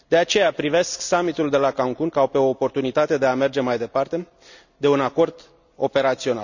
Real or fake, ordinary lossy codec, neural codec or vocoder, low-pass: real; none; none; 7.2 kHz